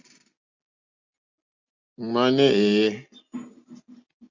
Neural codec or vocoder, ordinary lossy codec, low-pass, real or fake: none; MP3, 64 kbps; 7.2 kHz; real